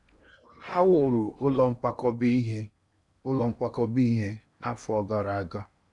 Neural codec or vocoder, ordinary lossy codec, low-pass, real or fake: codec, 16 kHz in and 24 kHz out, 0.8 kbps, FocalCodec, streaming, 65536 codes; none; 10.8 kHz; fake